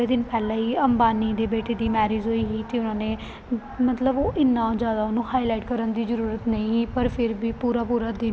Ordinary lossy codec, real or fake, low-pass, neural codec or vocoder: none; real; none; none